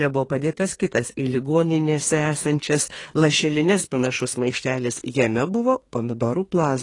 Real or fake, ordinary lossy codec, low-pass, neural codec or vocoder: fake; AAC, 32 kbps; 10.8 kHz; codec, 32 kHz, 1.9 kbps, SNAC